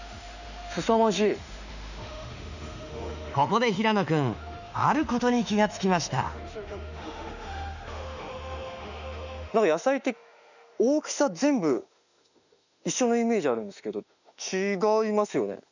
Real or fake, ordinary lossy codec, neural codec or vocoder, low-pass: fake; none; autoencoder, 48 kHz, 32 numbers a frame, DAC-VAE, trained on Japanese speech; 7.2 kHz